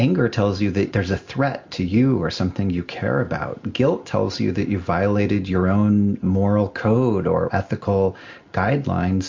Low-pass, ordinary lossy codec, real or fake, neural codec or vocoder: 7.2 kHz; MP3, 48 kbps; real; none